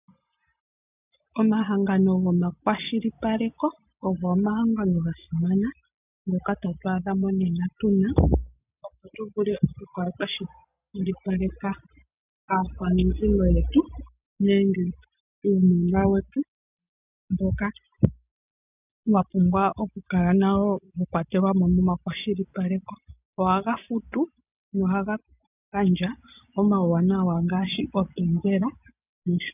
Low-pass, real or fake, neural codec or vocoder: 3.6 kHz; real; none